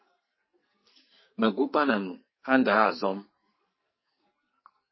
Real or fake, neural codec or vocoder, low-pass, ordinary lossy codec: fake; codec, 44.1 kHz, 2.6 kbps, SNAC; 7.2 kHz; MP3, 24 kbps